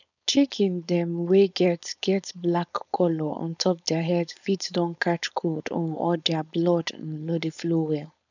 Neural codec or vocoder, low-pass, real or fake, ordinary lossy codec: codec, 16 kHz, 4.8 kbps, FACodec; 7.2 kHz; fake; none